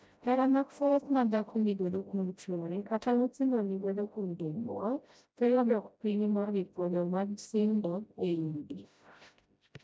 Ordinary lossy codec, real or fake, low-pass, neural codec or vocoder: none; fake; none; codec, 16 kHz, 0.5 kbps, FreqCodec, smaller model